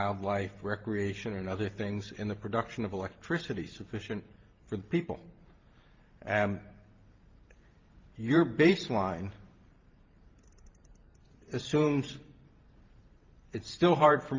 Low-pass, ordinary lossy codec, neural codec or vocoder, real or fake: 7.2 kHz; Opus, 32 kbps; vocoder, 44.1 kHz, 128 mel bands every 512 samples, BigVGAN v2; fake